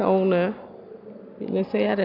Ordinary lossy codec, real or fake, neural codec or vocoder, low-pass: none; real; none; 5.4 kHz